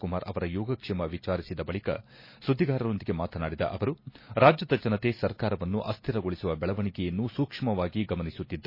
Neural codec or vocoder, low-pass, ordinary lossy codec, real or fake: none; 5.4 kHz; MP3, 24 kbps; real